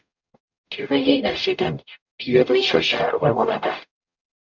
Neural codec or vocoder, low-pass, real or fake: codec, 44.1 kHz, 0.9 kbps, DAC; 7.2 kHz; fake